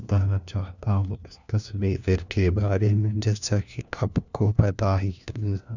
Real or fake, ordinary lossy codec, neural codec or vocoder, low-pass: fake; none; codec, 16 kHz, 1 kbps, FunCodec, trained on LibriTTS, 50 frames a second; 7.2 kHz